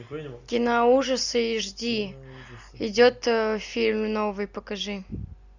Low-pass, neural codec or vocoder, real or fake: 7.2 kHz; none; real